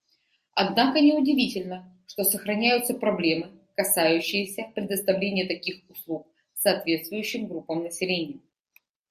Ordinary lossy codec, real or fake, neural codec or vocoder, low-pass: Opus, 64 kbps; real; none; 14.4 kHz